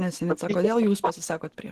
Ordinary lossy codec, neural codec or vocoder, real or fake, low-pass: Opus, 16 kbps; none; real; 14.4 kHz